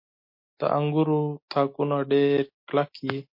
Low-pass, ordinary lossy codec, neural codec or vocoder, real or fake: 5.4 kHz; MP3, 32 kbps; none; real